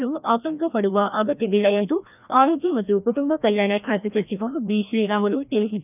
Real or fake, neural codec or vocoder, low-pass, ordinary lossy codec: fake; codec, 16 kHz, 1 kbps, FreqCodec, larger model; 3.6 kHz; none